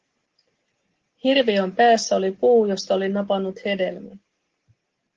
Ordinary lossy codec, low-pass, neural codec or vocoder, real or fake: Opus, 16 kbps; 7.2 kHz; none; real